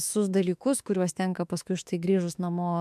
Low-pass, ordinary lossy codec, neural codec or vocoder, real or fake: 14.4 kHz; MP3, 96 kbps; autoencoder, 48 kHz, 32 numbers a frame, DAC-VAE, trained on Japanese speech; fake